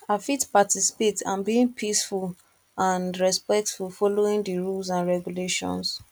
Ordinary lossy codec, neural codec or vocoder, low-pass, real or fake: none; none; 19.8 kHz; real